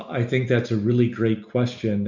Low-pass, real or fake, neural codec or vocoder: 7.2 kHz; real; none